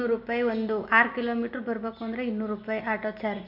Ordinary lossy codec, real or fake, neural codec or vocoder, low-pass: none; real; none; 5.4 kHz